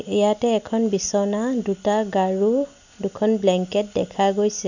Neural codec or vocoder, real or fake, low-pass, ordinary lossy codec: none; real; 7.2 kHz; none